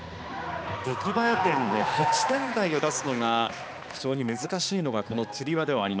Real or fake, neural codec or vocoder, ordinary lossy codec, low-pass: fake; codec, 16 kHz, 2 kbps, X-Codec, HuBERT features, trained on balanced general audio; none; none